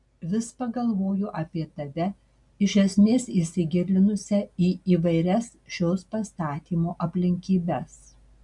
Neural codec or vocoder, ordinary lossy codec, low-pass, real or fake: none; MP3, 96 kbps; 10.8 kHz; real